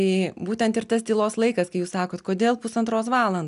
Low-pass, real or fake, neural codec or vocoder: 10.8 kHz; real; none